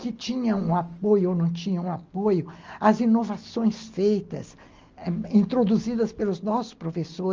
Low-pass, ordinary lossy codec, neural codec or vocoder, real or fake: 7.2 kHz; Opus, 24 kbps; none; real